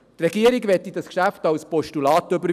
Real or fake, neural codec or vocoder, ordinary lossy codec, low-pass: real; none; none; 14.4 kHz